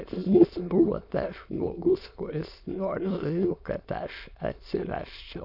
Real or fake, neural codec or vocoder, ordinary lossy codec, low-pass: fake; autoencoder, 22.05 kHz, a latent of 192 numbers a frame, VITS, trained on many speakers; MP3, 48 kbps; 5.4 kHz